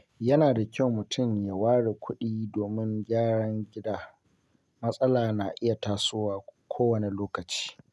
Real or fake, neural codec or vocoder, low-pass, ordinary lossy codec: real; none; none; none